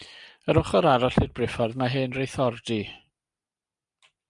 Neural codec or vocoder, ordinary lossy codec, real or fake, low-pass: none; MP3, 96 kbps; real; 10.8 kHz